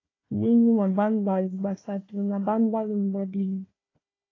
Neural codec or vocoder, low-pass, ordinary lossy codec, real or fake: codec, 16 kHz, 1 kbps, FunCodec, trained on Chinese and English, 50 frames a second; 7.2 kHz; AAC, 32 kbps; fake